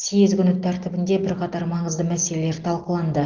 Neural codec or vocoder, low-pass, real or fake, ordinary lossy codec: none; 7.2 kHz; real; Opus, 16 kbps